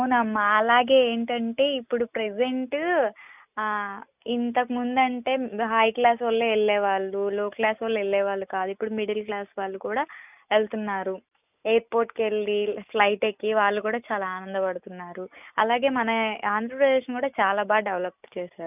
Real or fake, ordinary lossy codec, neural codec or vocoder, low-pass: real; none; none; 3.6 kHz